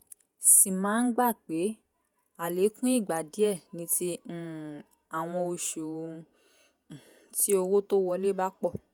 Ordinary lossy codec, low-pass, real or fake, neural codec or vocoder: none; none; fake; vocoder, 48 kHz, 128 mel bands, Vocos